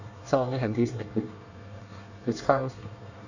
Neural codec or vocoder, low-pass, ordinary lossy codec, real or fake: codec, 24 kHz, 1 kbps, SNAC; 7.2 kHz; none; fake